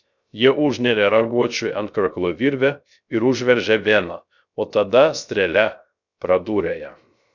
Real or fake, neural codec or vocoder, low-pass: fake; codec, 16 kHz, 0.3 kbps, FocalCodec; 7.2 kHz